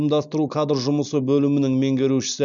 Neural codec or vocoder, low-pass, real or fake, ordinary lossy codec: none; 7.2 kHz; real; none